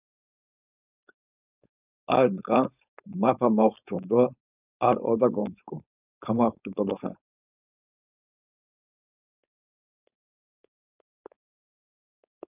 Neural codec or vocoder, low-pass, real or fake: codec, 16 kHz, 4.8 kbps, FACodec; 3.6 kHz; fake